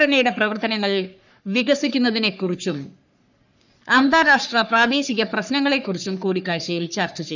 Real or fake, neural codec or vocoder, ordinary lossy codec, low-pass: fake; codec, 44.1 kHz, 3.4 kbps, Pupu-Codec; none; 7.2 kHz